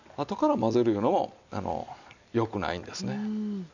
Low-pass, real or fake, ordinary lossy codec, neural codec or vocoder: 7.2 kHz; real; none; none